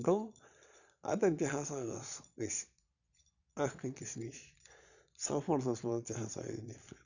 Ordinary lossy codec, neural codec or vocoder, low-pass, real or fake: none; vocoder, 22.05 kHz, 80 mel bands, Vocos; 7.2 kHz; fake